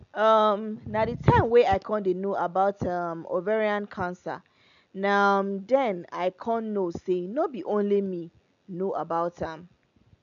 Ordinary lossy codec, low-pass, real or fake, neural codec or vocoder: none; 7.2 kHz; real; none